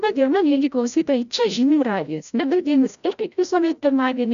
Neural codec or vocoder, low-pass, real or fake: codec, 16 kHz, 0.5 kbps, FreqCodec, larger model; 7.2 kHz; fake